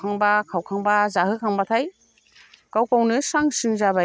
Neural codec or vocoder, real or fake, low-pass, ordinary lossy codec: none; real; none; none